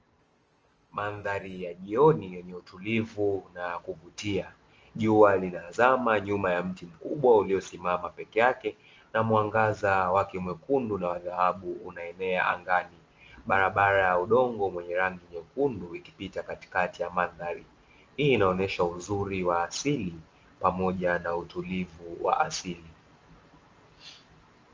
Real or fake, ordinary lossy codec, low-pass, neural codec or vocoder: real; Opus, 24 kbps; 7.2 kHz; none